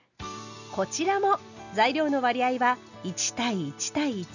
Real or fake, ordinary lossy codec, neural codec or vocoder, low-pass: real; none; none; 7.2 kHz